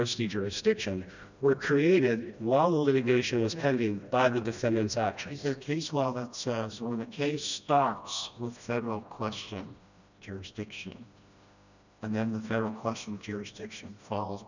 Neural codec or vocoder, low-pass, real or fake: codec, 16 kHz, 1 kbps, FreqCodec, smaller model; 7.2 kHz; fake